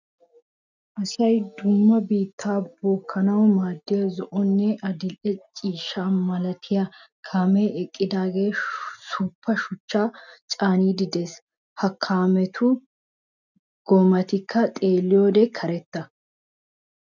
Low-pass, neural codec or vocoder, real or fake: 7.2 kHz; none; real